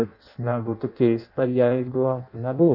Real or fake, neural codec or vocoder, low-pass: fake; codec, 16 kHz in and 24 kHz out, 0.6 kbps, FireRedTTS-2 codec; 5.4 kHz